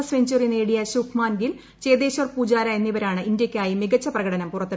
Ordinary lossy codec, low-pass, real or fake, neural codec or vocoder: none; none; real; none